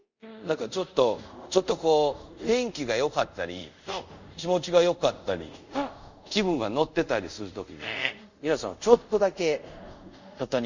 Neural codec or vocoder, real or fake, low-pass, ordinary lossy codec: codec, 24 kHz, 0.5 kbps, DualCodec; fake; 7.2 kHz; Opus, 64 kbps